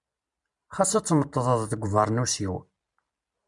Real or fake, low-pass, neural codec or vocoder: real; 10.8 kHz; none